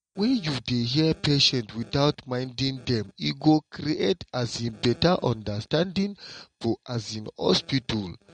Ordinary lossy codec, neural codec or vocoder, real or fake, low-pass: MP3, 48 kbps; none; real; 9.9 kHz